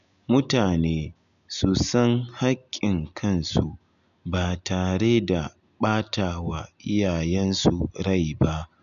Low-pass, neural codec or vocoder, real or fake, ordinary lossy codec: 7.2 kHz; none; real; none